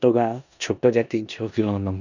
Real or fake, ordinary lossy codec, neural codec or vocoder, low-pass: fake; none; codec, 16 kHz in and 24 kHz out, 0.9 kbps, LongCat-Audio-Codec, four codebook decoder; 7.2 kHz